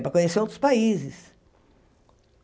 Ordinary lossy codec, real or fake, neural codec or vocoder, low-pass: none; real; none; none